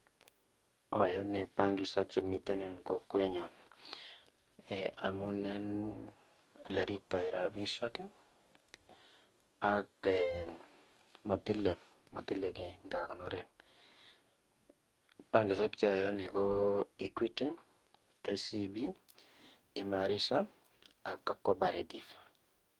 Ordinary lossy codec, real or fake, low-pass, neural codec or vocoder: Opus, 32 kbps; fake; 19.8 kHz; codec, 44.1 kHz, 2.6 kbps, DAC